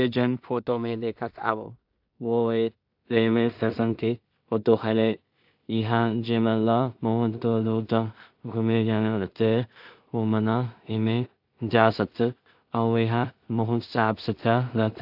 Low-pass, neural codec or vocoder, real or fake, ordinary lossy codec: 5.4 kHz; codec, 16 kHz in and 24 kHz out, 0.4 kbps, LongCat-Audio-Codec, two codebook decoder; fake; AAC, 48 kbps